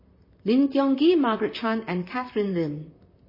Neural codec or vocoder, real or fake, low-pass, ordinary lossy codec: vocoder, 44.1 kHz, 128 mel bands, Pupu-Vocoder; fake; 5.4 kHz; MP3, 24 kbps